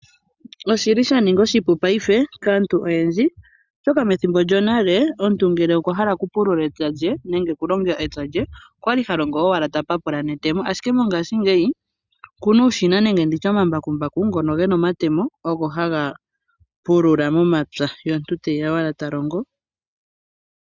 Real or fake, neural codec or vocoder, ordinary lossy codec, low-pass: real; none; Opus, 64 kbps; 7.2 kHz